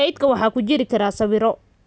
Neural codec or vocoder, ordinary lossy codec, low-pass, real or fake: none; none; none; real